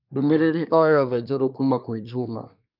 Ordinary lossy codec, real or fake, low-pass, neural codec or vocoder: none; fake; 5.4 kHz; codec, 24 kHz, 1 kbps, SNAC